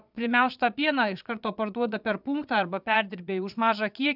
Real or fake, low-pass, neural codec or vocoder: fake; 5.4 kHz; vocoder, 22.05 kHz, 80 mel bands, Vocos